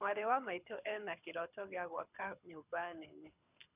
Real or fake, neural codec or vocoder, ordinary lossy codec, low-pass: fake; codec, 24 kHz, 6 kbps, HILCodec; none; 3.6 kHz